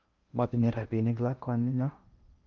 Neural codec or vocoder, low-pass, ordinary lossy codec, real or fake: codec, 16 kHz in and 24 kHz out, 0.6 kbps, FocalCodec, streaming, 2048 codes; 7.2 kHz; Opus, 32 kbps; fake